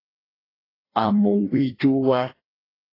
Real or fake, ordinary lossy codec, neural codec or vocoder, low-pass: fake; AAC, 24 kbps; codec, 16 kHz, 1 kbps, FreqCodec, larger model; 5.4 kHz